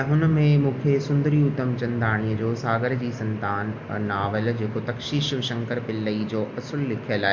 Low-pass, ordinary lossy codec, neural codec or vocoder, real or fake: 7.2 kHz; MP3, 48 kbps; none; real